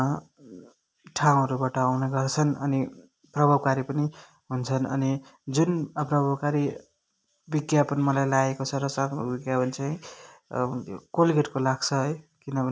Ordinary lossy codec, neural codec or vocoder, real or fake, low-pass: none; none; real; none